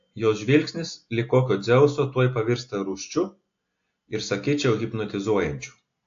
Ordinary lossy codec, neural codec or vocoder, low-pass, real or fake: AAC, 48 kbps; none; 7.2 kHz; real